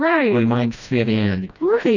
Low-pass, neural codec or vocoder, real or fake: 7.2 kHz; codec, 16 kHz, 1 kbps, FreqCodec, smaller model; fake